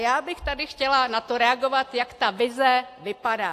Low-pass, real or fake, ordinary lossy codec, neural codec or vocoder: 14.4 kHz; real; AAC, 64 kbps; none